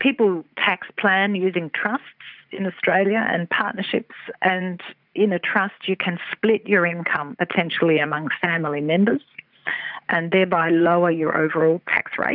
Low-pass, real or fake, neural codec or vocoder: 5.4 kHz; fake; vocoder, 44.1 kHz, 80 mel bands, Vocos